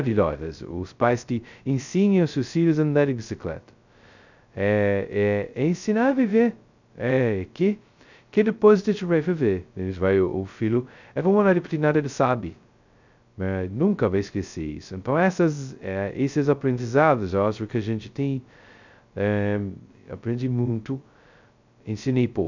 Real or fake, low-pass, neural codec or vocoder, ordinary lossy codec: fake; 7.2 kHz; codec, 16 kHz, 0.2 kbps, FocalCodec; none